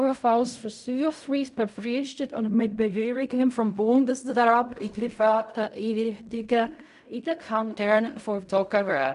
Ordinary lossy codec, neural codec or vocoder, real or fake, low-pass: none; codec, 16 kHz in and 24 kHz out, 0.4 kbps, LongCat-Audio-Codec, fine tuned four codebook decoder; fake; 10.8 kHz